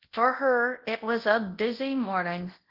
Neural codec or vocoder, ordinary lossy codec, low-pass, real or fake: codec, 24 kHz, 0.9 kbps, WavTokenizer, large speech release; Opus, 16 kbps; 5.4 kHz; fake